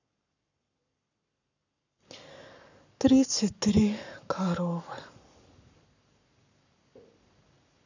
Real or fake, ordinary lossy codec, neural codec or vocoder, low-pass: fake; none; codec, 44.1 kHz, 7.8 kbps, Pupu-Codec; 7.2 kHz